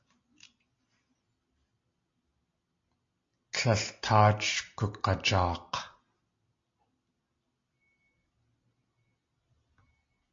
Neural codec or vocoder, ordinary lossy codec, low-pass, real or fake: none; AAC, 48 kbps; 7.2 kHz; real